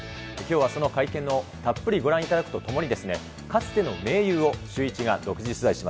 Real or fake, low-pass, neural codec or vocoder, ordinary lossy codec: real; none; none; none